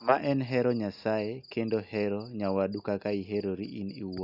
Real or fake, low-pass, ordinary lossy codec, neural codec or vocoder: real; 5.4 kHz; Opus, 64 kbps; none